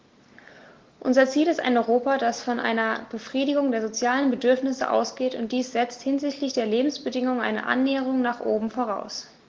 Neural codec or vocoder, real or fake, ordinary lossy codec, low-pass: none; real; Opus, 16 kbps; 7.2 kHz